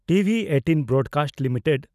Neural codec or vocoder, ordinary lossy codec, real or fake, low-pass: none; none; real; 14.4 kHz